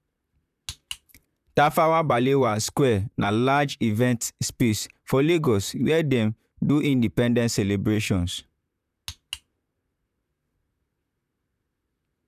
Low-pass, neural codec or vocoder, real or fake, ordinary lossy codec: 14.4 kHz; vocoder, 44.1 kHz, 128 mel bands, Pupu-Vocoder; fake; none